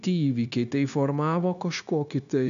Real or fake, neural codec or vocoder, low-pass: fake; codec, 16 kHz, 0.9 kbps, LongCat-Audio-Codec; 7.2 kHz